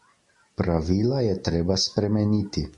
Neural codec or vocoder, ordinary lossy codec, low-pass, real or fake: none; MP3, 48 kbps; 10.8 kHz; real